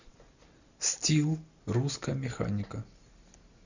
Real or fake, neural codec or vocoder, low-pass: real; none; 7.2 kHz